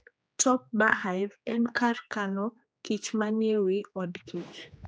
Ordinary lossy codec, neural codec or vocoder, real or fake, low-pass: none; codec, 16 kHz, 2 kbps, X-Codec, HuBERT features, trained on general audio; fake; none